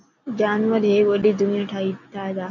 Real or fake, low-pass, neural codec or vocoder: real; 7.2 kHz; none